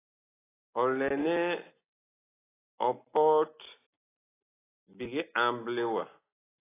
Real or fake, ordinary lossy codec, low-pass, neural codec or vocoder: real; AAC, 16 kbps; 3.6 kHz; none